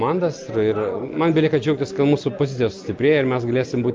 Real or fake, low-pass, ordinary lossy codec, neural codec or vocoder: real; 7.2 kHz; Opus, 32 kbps; none